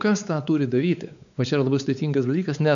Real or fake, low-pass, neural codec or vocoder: fake; 7.2 kHz; codec, 16 kHz, 4 kbps, X-Codec, WavLM features, trained on Multilingual LibriSpeech